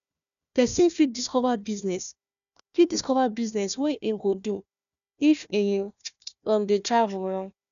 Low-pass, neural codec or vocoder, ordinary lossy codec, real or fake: 7.2 kHz; codec, 16 kHz, 1 kbps, FunCodec, trained on Chinese and English, 50 frames a second; none; fake